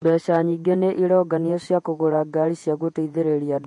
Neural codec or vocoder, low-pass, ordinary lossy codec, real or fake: vocoder, 48 kHz, 128 mel bands, Vocos; 10.8 kHz; MP3, 48 kbps; fake